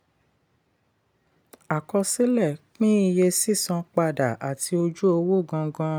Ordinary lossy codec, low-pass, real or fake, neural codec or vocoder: none; none; real; none